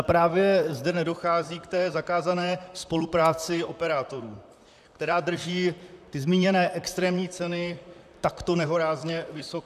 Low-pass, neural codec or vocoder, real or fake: 14.4 kHz; vocoder, 44.1 kHz, 128 mel bands, Pupu-Vocoder; fake